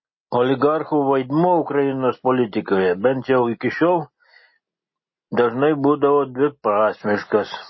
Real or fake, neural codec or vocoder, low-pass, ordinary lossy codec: real; none; 7.2 kHz; MP3, 24 kbps